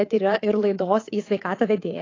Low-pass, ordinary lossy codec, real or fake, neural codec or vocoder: 7.2 kHz; AAC, 32 kbps; fake; codec, 24 kHz, 3.1 kbps, DualCodec